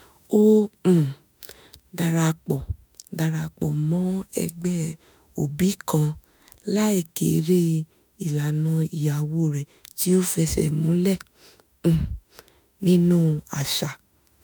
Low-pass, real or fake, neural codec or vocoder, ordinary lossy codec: none; fake; autoencoder, 48 kHz, 32 numbers a frame, DAC-VAE, trained on Japanese speech; none